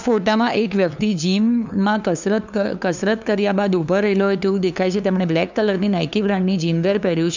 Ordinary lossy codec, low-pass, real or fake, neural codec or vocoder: none; 7.2 kHz; fake; codec, 16 kHz, 2 kbps, FunCodec, trained on LibriTTS, 25 frames a second